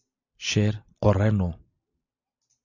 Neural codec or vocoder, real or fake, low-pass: none; real; 7.2 kHz